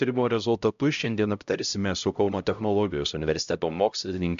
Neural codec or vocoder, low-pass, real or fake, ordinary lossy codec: codec, 16 kHz, 0.5 kbps, X-Codec, HuBERT features, trained on LibriSpeech; 7.2 kHz; fake; MP3, 64 kbps